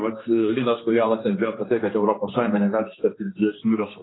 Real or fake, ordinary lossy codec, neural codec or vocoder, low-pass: fake; AAC, 16 kbps; codec, 16 kHz, 2 kbps, X-Codec, HuBERT features, trained on general audio; 7.2 kHz